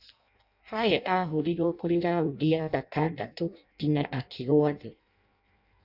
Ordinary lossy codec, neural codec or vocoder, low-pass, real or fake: none; codec, 16 kHz in and 24 kHz out, 0.6 kbps, FireRedTTS-2 codec; 5.4 kHz; fake